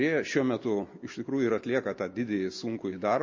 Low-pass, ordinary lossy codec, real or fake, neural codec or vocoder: 7.2 kHz; MP3, 32 kbps; real; none